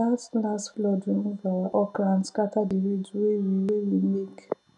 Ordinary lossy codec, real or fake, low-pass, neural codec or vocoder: none; real; 10.8 kHz; none